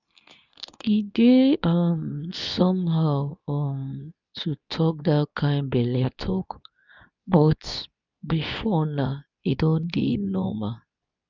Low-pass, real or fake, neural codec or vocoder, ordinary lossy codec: 7.2 kHz; fake; codec, 24 kHz, 0.9 kbps, WavTokenizer, medium speech release version 2; none